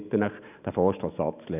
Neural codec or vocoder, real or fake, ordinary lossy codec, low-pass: none; real; none; 3.6 kHz